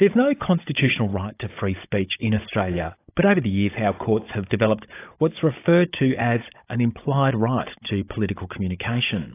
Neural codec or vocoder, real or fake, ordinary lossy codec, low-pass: codec, 16 kHz, 16 kbps, FunCodec, trained on Chinese and English, 50 frames a second; fake; AAC, 24 kbps; 3.6 kHz